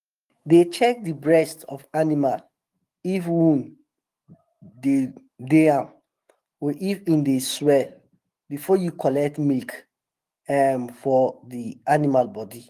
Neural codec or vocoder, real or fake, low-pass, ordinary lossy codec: autoencoder, 48 kHz, 128 numbers a frame, DAC-VAE, trained on Japanese speech; fake; 14.4 kHz; Opus, 24 kbps